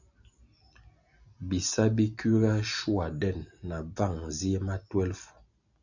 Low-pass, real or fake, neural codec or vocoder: 7.2 kHz; real; none